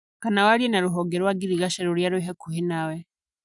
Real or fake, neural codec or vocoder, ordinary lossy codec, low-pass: real; none; none; 10.8 kHz